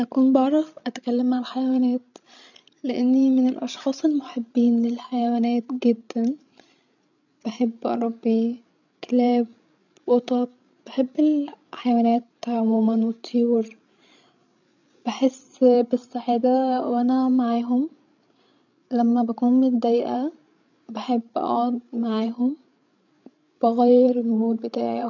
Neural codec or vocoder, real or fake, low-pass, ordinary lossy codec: codec, 16 kHz, 16 kbps, FreqCodec, larger model; fake; 7.2 kHz; none